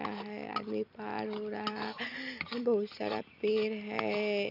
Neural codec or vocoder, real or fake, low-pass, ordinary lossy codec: vocoder, 44.1 kHz, 128 mel bands every 256 samples, BigVGAN v2; fake; 5.4 kHz; none